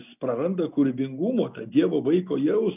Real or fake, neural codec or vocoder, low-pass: real; none; 3.6 kHz